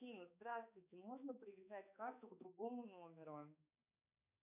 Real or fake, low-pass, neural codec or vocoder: fake; 3.6 kHz; codec, 16 kHz, 2 kbps, X-Codec, HuBERT features, trained on balanced general audio